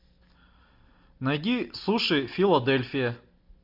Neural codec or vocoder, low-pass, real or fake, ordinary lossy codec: none; 5.4 kHz; real; MP3, 48 kbps